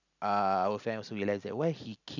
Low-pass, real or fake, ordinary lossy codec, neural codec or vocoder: 7.2 kHz; real; none; none